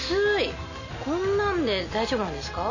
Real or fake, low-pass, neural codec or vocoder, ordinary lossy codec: real; 7.2 kHz; none; none